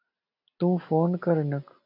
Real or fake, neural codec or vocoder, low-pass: real; none; 5.4 kHz